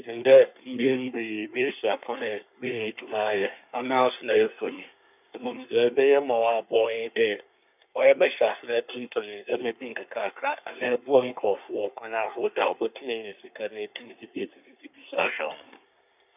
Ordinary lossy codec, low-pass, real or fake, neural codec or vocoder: none; 3.6 kHz; fake; codec, 24 kHz, 1 kbps, SNAC